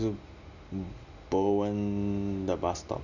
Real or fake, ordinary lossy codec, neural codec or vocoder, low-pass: real; none; none; 7.2 kHz